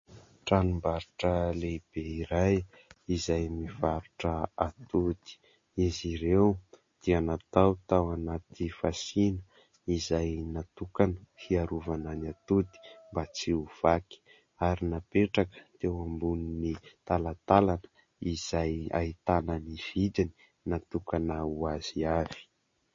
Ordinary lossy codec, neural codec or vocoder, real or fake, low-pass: MP3, 32 kbps; none; real; 7.2 kHz